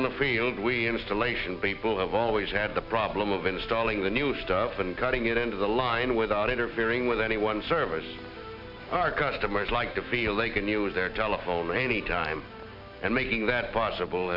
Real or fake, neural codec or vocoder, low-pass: real; none; 5.4 kHz